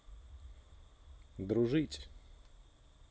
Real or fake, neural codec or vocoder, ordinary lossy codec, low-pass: real; none; none; none